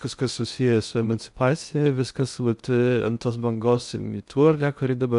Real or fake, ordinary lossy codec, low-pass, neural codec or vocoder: fake; MP3, 96 kbps; 10.8 kHz; codec, 16 kHz in and 24 kHz out, 0.6 kbps, FocalCodec, streaming, 2048 codes